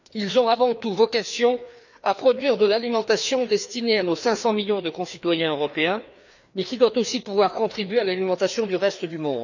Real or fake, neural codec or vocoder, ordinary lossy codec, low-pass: fake; codec, 16 kHz, 2 kbps, FreqCodec, larger model; none; 7.2 kHz